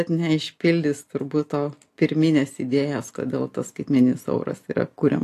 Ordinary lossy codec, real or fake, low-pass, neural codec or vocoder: AAC, 64 kbps; real; 14.4 kHz; none